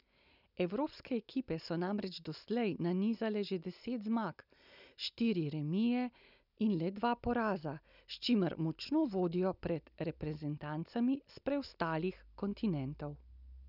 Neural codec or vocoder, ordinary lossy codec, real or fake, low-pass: none; none; real; 5.4 kHz